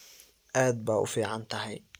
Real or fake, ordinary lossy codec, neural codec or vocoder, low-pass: real; none; none; none